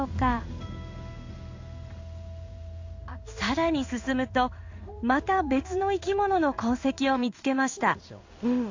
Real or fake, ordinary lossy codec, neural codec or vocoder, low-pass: fake; AAC, 48 kbps; codec, 16 kHz in and 24 kHz out, 1 kbps, XY-Tokenizer; 7.2 kHz